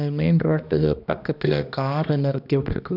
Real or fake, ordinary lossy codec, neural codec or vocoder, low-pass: fake; none; codec, 16 kHz, 1 kbps, X-Codec, HuBERT features, trained on balanced general audio; 5.4 kHz